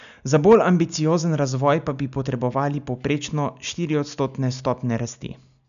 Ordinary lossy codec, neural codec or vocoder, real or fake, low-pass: none; none; real; 7.2 kHz